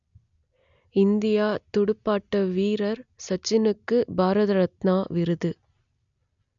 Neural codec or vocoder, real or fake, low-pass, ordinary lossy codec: none; real; 7.2 kHz; none